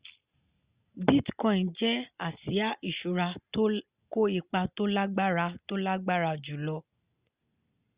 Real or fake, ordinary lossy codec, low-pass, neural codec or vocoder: real; Opus, 64 kbps; 3.6 kHz; none